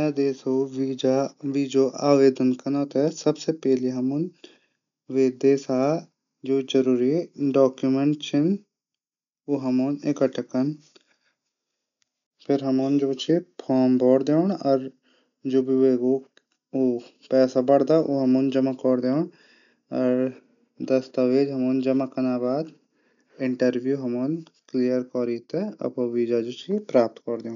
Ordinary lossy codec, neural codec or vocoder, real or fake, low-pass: none; none; real; 7.2 kHz